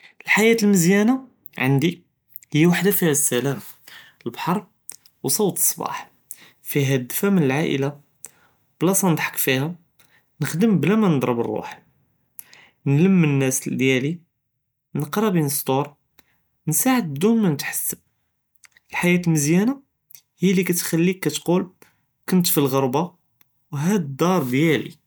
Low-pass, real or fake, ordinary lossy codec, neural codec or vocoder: none; real; none; none